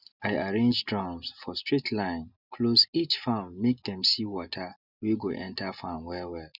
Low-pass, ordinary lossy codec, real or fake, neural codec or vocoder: 5.4 kHz; none; real; none